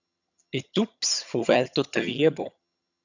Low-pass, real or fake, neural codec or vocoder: 7.2 kHz; fake; vocoder, 22.05 kHz, 80 mel bands, HiFi-GAN